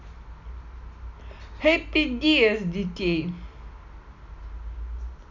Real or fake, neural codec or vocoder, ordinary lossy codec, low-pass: real; none; none; 7.2 kHz